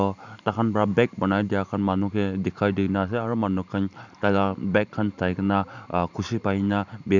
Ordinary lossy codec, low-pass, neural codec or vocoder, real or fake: none; 7.2 kHz; vocoder, 44.1 kHz, 128 mel bands every 512 samples, BigVGAN v2; fake